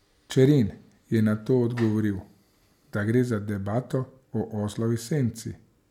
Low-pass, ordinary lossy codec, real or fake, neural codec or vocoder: 19.8 kHz; MP3, 96 kbps; real; none